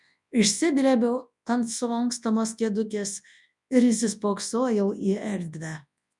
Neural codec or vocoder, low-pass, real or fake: codec, 24 kHz, 0.9 kbps, WavTokenizer, large speech release; 10.8 kHz; fake